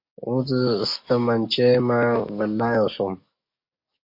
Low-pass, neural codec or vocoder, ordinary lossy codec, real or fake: 5.4 kHz; codec, 44.1 kHz, 7.8 kbps, DAC; MP3, 32 kbps; fake